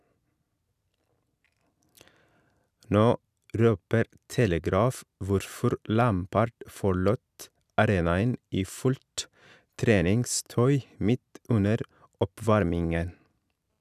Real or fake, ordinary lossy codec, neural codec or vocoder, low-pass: real; none; none; 14.4 kHz